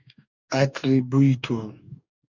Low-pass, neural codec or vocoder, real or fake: 7.2 kHz; codec, 44.1 kHz, 2.6 kbps, DAC; fake